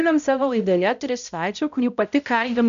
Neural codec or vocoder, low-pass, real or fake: codec, 16 kHz, 0.5 kbps, X-Codec, HuBERT features, trained on balanced general audio; 7.2 kHz; fake